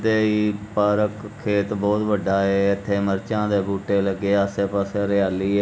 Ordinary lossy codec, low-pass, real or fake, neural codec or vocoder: none; none; real; none